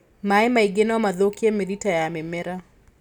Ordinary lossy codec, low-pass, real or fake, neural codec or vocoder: none; 19.8 kHz; real; none